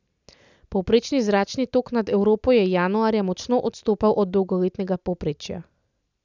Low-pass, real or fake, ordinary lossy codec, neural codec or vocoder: 7.2 kHz; real; none; none